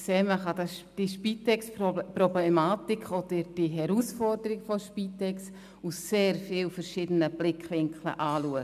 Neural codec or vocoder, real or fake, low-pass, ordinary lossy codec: none; real; 14.4 kHz; none